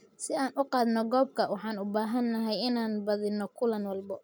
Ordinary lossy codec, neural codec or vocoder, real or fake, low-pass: none; none; real; none